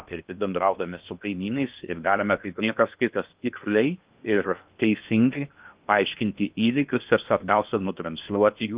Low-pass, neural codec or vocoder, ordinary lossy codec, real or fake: 3.6 kHz; codec, 16 kHz in and 24 kHz out, 0.6 kbps, FocalCodec, streaming, 2048 codes; Opus, 32 kbps; fake